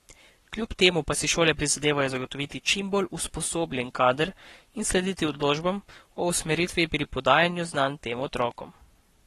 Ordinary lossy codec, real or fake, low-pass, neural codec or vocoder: AAC, 32 kbps; fake; 19.8 kHz; codec, 44.1 kHz, 7.8 kbps, Pupu-Codec